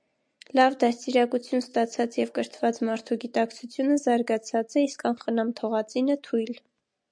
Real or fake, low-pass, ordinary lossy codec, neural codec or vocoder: real; 9.9 kHz; MP3, 64 kbps; none